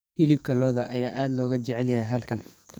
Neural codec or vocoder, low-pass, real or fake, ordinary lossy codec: codec, 44.1 kHz, 2.6 kbps, SNAC; none; fake; none